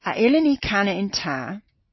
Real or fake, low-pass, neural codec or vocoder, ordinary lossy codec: real; 7.2 kHz; none; MP3, 24 kbps